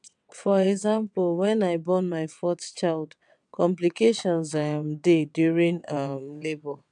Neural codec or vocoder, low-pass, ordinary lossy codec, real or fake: vocoder, 22.05 kHz, 80 mel bands, WaveNeXt; 9.9 kHz; none; fake